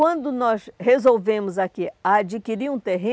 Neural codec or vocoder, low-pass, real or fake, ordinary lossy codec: none; none; real; none